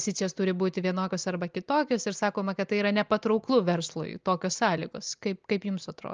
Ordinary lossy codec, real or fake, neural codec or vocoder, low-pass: Opus, 24 kbps; real; none; 7.2 kHz